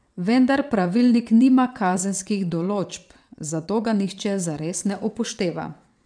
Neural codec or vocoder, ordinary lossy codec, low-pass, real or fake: vocoder, 22.05 kHz, 80 mel bands, WaveNeXt; none; 9.9 kHz; fake